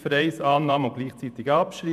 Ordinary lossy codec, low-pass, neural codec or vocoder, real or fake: MP3, 96 kbps; 14.4 kHz; vocoder, 48 kHz, 128 mel bands, Vocos; fake